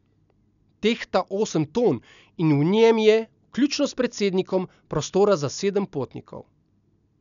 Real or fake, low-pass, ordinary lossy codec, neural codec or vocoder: real; 7.2 kHz; none; none